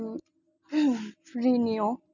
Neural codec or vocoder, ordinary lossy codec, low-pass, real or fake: none; none; 7.2 kHz; real